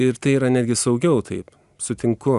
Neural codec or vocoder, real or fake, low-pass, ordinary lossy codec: none; real; 10.8 kHz; Opus, 64 kbps